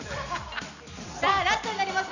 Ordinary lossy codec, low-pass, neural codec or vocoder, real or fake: none; 7.2 kHz; none; real